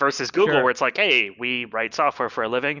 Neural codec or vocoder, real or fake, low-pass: none; real; 7.2 kHz